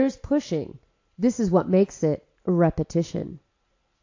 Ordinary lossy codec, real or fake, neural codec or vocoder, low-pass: AAC, 48 kbps; real; none; 7.2 kHz